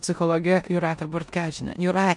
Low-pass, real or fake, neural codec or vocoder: 10.8 kHz; fake; codec, 16 kHz in and 24 kHz out, 0.9 kbps, LongCat-Audio-Codec, four codebook decoder